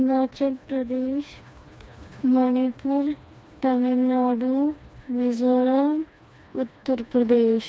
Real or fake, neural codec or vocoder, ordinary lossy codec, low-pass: fake; codec, 16 kHz, 2 kbps, FreqCodec, smaller model; none; none